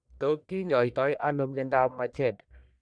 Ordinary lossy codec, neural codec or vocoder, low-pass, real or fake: none; codec, 44.1 kHz, 1.7 kbps, Pupu-Codec; 9.9 kHz; fake